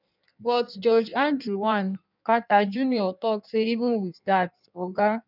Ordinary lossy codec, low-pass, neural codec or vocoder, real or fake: none; 5.4 kHz; codec, 16 kHz in and 24 kHz out, 1.1 kbps, FireRedTTS-2 codec; fake